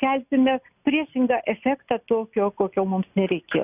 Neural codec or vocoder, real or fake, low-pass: none; real; 3.6 kHz